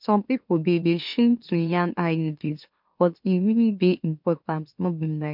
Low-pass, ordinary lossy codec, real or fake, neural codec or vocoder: 5.4 kHz; MP3, 48 kbps; fake; autoencoder, 44.1 kHz, a latent of 192 numbers a frame, MeloTTS